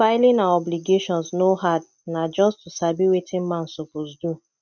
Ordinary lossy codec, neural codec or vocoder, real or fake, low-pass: none; none; real; 7.2 kHz